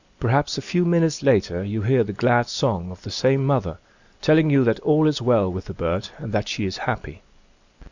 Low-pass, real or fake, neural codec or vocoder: 7.2 kHz; real; none